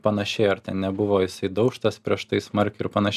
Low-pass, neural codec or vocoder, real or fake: 14.4 kHz; none; real